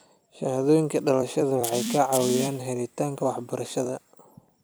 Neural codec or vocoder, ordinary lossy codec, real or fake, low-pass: vocoder, 44.1 kHz, 128 mel bands every 256 samples, BigVGAN v2; none; fake; none